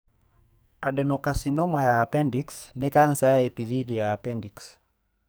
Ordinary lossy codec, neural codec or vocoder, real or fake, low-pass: none; codec, 44.1 kHz, 2.6 kbps, SNAC; fake; none